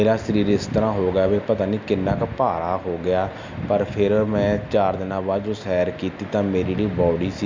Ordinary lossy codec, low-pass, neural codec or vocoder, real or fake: MP3, 64 kbps; 7.2 kHz; none; real